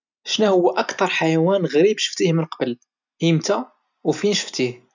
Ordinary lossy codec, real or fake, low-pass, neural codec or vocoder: none; real; 7.2 kHz; none